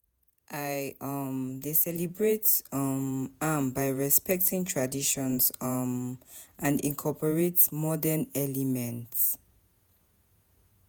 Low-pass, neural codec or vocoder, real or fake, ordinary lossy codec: none; vocoder, 48 kHz, 128 mel bands, Vocos; fake; none